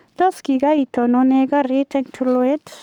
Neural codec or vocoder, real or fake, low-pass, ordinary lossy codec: codec, 44.1 kHz, 7.8 kbps, Pupu-Codec; fake; 19.8 kHz; none